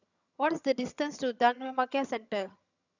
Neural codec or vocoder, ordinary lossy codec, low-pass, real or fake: vocoder, 22.05 kHz, 80 mel bands, HiFi-GAN; none; 7.2 kHz; fake